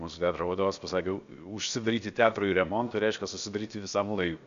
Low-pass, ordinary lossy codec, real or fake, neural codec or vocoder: 7.2 kHz; Opus, 64 kbps; fake; codec, 16 kHz, about 1 kbps, DyCAST, with the encoder's durations